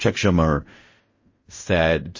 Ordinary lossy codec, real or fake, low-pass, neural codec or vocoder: MP3, 32 kbps; fake; 7.2 kHz; codec, 16 kHz in and 24 kHz out, 0.4 kbps, LongCat-Audio-Codec, fine tuned four codebook decoder